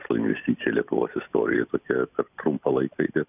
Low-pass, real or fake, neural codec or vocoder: 3.6 kHz; real; none